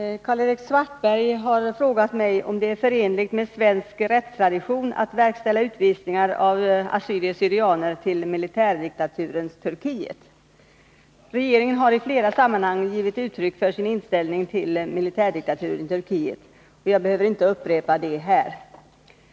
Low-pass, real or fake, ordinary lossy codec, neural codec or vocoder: none; real; none; none